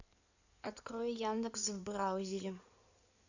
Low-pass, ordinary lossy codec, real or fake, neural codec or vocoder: 7.2 kHz; none; fake; codec, 16 kHz in and 24 kHz out, 2.2 kbps, FireRedTTS-2 codec